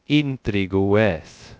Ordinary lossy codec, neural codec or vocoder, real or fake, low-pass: none; codec, 16 kHz, 0.2 kbps, FocalCodec; fake; none